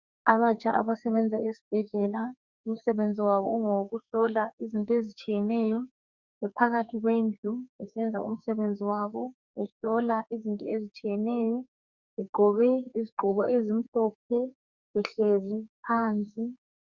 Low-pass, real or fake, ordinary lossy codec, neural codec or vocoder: 7.2 kHz; fake; Opus, 64 kbps; codec, 44.1 kHz, 2.6 kbps, SNAC